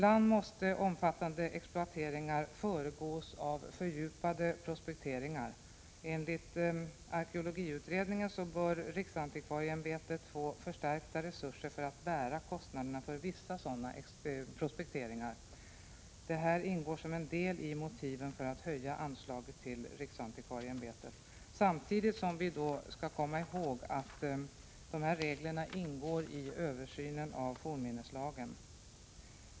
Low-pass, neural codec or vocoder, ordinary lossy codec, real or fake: none; none; none; real